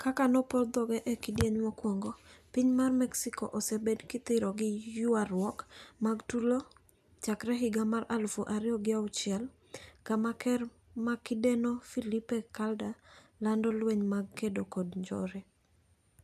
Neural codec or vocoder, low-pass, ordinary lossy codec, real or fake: none; 14.4 kHz; none; real